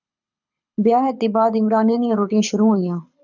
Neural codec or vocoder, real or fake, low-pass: codec, 24 kHz, 6 kbps, HILCodec; fake; 7.2 kHz